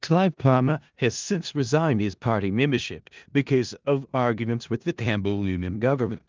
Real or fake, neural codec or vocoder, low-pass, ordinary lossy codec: fake; codec, 16 kHz in and 24 kHz out, 0.4 kbps, LongCat-Audio-Codec, four codebook decoder; 7.2 kHz; Opus, 24 kbps